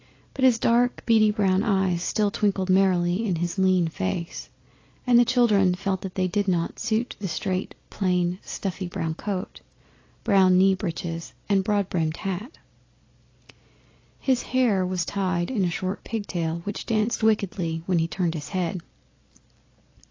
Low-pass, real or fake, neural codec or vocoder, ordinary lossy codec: 7.2 kHz; real; none; AAC, 32 kbps